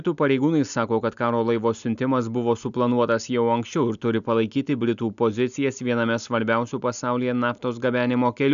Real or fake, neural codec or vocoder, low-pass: real; none; 7.2 kHz